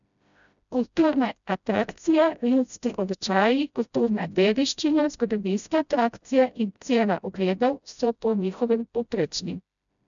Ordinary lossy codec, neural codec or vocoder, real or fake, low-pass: none; codec, 16 kHz, 0.5 kbps, FreqCodec, smaller model; fake; 7.2 kHz